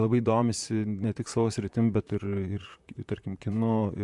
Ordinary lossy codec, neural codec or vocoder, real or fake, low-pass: MP3, 64 kbps; vocoder, 44.1 kHz, 128 mel bands, Pupu-Vocoder; fake; 10.8 kHz